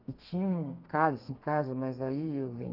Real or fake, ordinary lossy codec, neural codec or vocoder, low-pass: fake; Opus, 24 kbps; codec, 32 kHz, 1.9 kbps, SNAC; 5.4 kHz